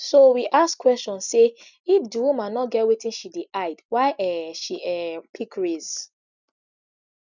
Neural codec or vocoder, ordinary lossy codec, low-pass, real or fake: none; none; 7.2 kHz; real